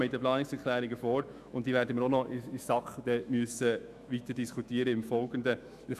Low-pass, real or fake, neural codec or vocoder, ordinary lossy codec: 14.4 kHz; fake; autoencoder, 48 kHz, 128 numbers a frame, DAC-VAE, trained on Japanese speech; none